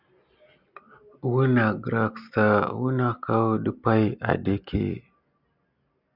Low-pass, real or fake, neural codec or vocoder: 5.4 kHz; real; none